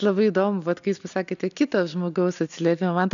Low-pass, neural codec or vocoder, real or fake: 7.2 kHz; none; real